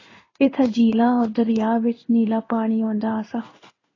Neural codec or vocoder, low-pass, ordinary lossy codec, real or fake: codec, 16 kHz in and 24 kHz out, 1 kbps, XY-Tokenizer; 7.2 kHz; AAC, 32 kbps; fake